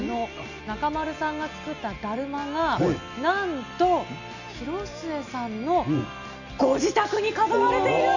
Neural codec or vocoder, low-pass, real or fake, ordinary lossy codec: none; 7.2 kHz; real; none